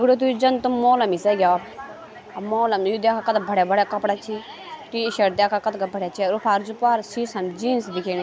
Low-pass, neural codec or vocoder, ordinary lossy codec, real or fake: none; none; none; real